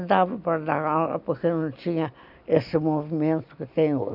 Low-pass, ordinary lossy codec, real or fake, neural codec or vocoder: 5.4 kHz; none; real; none